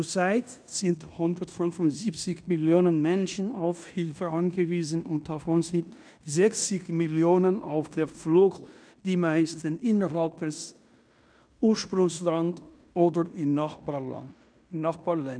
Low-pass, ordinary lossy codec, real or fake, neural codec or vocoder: 9.9 kHz; none; fake; codec, 16 kHz in and 24 kHz out, 0.9 kbps, LongCat-Audio-Codec, fine tuned four codebook decoder